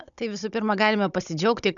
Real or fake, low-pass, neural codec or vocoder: fake; 7.2 kHz; codec, 16 kHz, 16 kbps, FunCodec, trained on Chinese and English, 50 frames a second